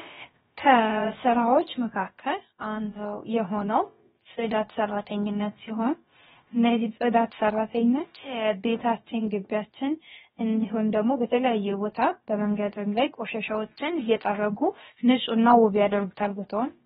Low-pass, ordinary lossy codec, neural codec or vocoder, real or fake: 7.2 kHz; AAC, 16 kbps; codec, 16 kHz, about 1 kbps, DyCAST, with the encoder's durations; fake